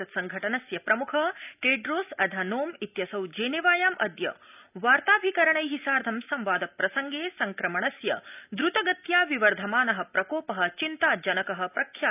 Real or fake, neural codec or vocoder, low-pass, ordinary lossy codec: real; none; 3.6 kHz; none